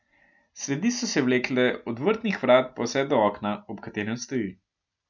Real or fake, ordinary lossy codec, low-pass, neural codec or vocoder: real; none; 7.2 kHz; none